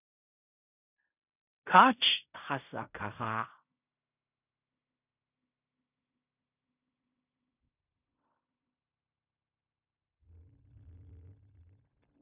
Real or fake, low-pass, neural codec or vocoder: fake; 3.6 kHz; codec, 16 kHz in and 24 kHz out, 0.4 kbps, LongCat-Audio-Codec, fine tuned four codebook decoder